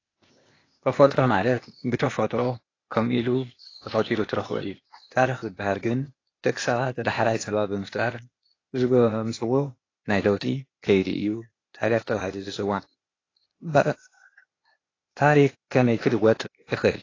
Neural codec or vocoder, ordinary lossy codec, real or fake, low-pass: codec, 16 kHz, 0.8 kbps, ZipCodec; AAC, 32 kbps; fake; 7.2 kHz